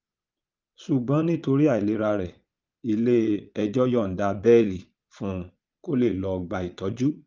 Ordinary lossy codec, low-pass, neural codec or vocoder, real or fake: Opus, 24 kbps; 7.2 kHz; vocoder, 22.05 kHz, 80 mel bands, Vocos; fake